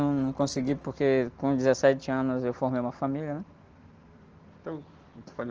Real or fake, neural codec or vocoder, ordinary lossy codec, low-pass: real; none; Opus, 16 kbps; 7.2 kHz